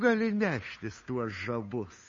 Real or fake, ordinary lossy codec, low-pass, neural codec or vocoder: fake; MP3, 32 kbps; 7.2 kHz; codec, 16 kHz, 8 kbps, FunCodec, trained on Chinese and English, 25 frames a second